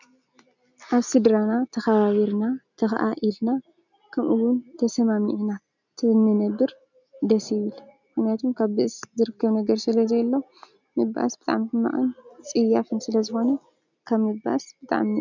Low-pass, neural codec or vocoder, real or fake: 7.2 kHz; none; real